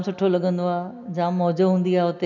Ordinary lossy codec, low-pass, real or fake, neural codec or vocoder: none; 7.2 kHz; real; none